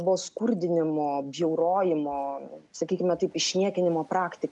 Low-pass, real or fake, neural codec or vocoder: 10.8 kHz; real; none